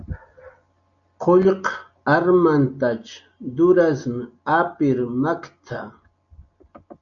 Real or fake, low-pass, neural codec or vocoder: real; 7.2 kHz; none